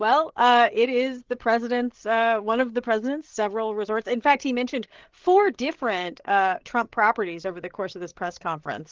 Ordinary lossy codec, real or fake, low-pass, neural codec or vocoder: Opus, 16 kbps; fake; 7.2 kHz; codec, 16 kHz, 8 kbps, FreqCodec, larger model